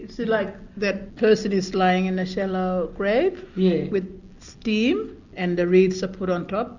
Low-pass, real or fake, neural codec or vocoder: 7.2 kHz; real; none